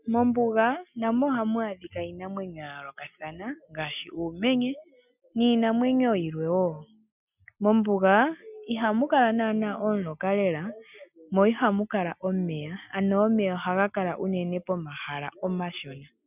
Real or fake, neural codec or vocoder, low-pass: real; none; 3.6 kHz